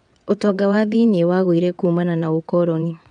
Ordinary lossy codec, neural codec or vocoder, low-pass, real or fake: MP3, 96 kbps; vocoder, 22.05 kHz, 80 mel bands, WaveNeXt; 9.9 kHz; fake